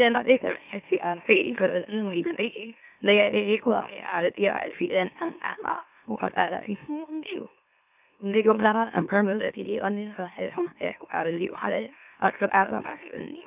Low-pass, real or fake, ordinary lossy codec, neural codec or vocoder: 3.6 kHz; fake; none; autoencoder, 44.1 kHz, a latent of 192 numbers a frame, MeloTTS